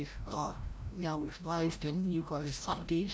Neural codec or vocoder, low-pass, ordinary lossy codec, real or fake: codec, 16 kHz, 0.5 kbps, FreqCodec, larger model; none; none; fake